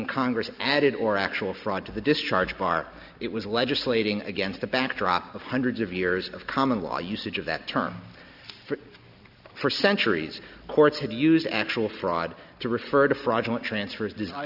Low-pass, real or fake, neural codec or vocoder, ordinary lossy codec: 5.4 kHz; real; none; AAC, 48 kbps